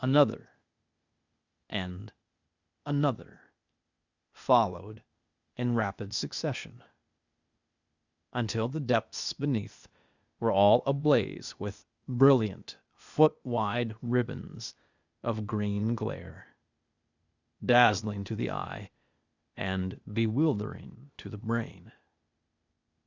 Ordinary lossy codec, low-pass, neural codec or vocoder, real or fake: Opus, 64 kbps; 7.2 kHz; codec, 16 kHz, 0.8 kbps, ZipCodec; fake